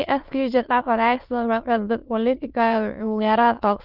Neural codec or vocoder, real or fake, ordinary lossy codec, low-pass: autoencoder, 22.05 kHz, a latent of 192 numbers a frame, VITS, trained on many speakers; fake; Opus, 32 kbps; 5.4 kHz